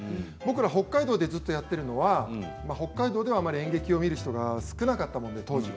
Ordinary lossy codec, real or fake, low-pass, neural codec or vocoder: none; real; none; none